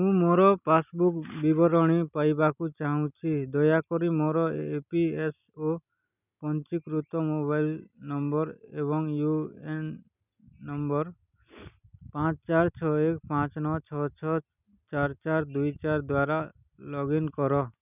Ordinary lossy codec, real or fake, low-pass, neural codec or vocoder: none; real; 3.6 kHz; none